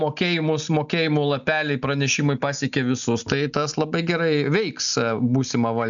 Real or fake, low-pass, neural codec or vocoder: real; 7.2 kHz; none